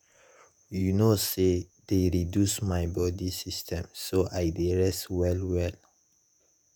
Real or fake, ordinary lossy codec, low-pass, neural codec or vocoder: fake; none; none; vocoder, 48 kHz, 128 mel bands, Vocos